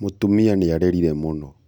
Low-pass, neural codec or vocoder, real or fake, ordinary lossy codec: 19.8 kHz; none; real; none